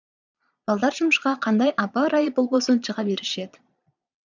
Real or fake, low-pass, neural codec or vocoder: fake; 7.2 kHz; vocoder, 22.05 kHz, 80 mel bands, Vocos